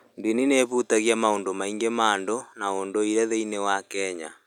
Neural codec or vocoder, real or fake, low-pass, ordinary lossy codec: none; real; 19.8 kHz; none